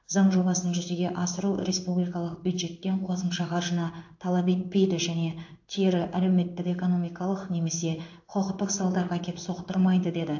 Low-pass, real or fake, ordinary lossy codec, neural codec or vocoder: 7.2 kHz; fake; AAC, 48 kbps; codec, 16 kHz in and 24 kHz out, 1 kbps, XY-Tokenizer